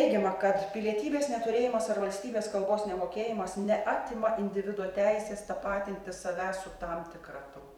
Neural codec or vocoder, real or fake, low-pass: vocoder, 44.1 kHz, 128 mel bands every 512 samples, BigVGAN v2; fake; 19.8 kHz